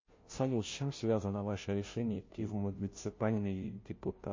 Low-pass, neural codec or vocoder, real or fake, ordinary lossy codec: 7.2 kHz; codec, 16 kHz, 0.5 kbps, FunCodec, trained on Chinese and English, 25 frames a second; fake; MP3, 32 kbps